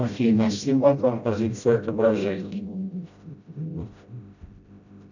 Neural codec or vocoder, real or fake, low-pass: codec, 16 kHz, 0.5 kbps, FreqCodec, smaller model; fake; 7.2 kHz